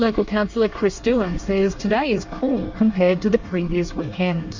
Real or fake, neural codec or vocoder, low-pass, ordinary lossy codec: fake; codec, 24 kHz, 1 kbps, SNAC; 7.2 kHz; Opus, 64 kbps